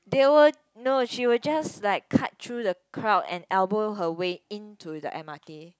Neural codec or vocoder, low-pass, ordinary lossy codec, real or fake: none; none; none; real